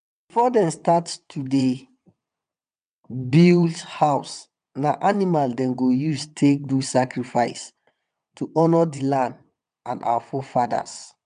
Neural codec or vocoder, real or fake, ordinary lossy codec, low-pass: vocoder, 22.05 kHz, 80 mel bands, WaveNeXt; fake; none; 9.9 kHz